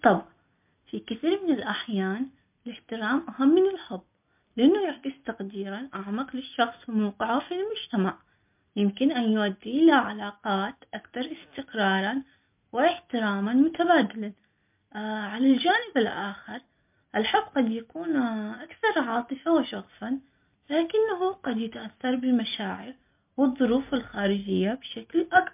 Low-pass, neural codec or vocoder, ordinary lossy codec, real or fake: 3.6 kHz; none; MP3, 32 kbps; real